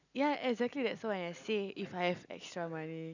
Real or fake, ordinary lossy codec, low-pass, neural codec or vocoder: real; none; 7.2 kHz; none